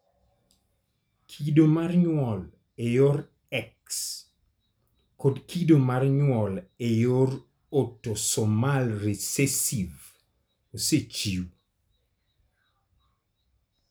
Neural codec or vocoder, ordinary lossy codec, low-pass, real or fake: none; none; none; real